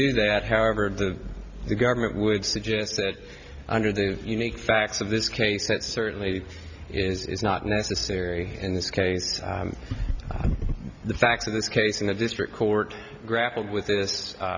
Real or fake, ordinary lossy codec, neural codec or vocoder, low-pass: real; Opus, 64 kbps; none; 7.2 kHz